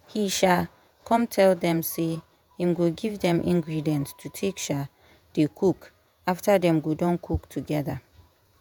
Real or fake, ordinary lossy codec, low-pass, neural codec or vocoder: fake; none; none; vocoder, 48 kHz, 128 mel bands, Vocos